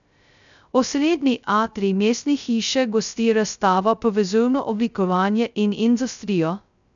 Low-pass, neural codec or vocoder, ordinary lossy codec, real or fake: 7.2 kHz; codec, 16 kHz, 0.2 kbps, FocalCodec; none; fake